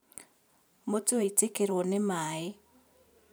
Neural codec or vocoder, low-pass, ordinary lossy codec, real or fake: vocoder, 44.1 kHz, 128 mel bands every 512 samples, BigVGAN v2; none; none; fake